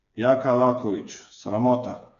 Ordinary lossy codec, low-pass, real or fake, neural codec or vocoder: none; 7.2 kHz; fake; codec, 16 kHz, 4 kbps, FreqCodec, smaller model